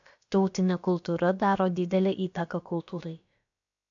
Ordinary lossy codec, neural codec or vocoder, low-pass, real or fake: AAC, 64 kbps; codec, 16 kHz, about 1 kbps, DyCAST, with the encoder's durations; 7.2 kHz; fake